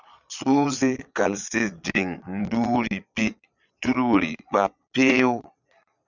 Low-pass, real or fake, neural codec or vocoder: 7.2 kHz; fake; vocoder, 22.05 kHz, 80 mel bands, Vocos